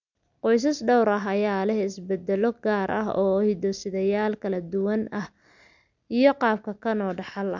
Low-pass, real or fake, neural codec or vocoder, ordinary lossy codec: 7.2 kHz; real; none; none